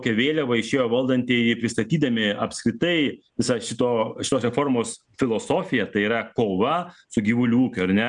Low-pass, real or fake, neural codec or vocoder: 9.9 kHz; real; none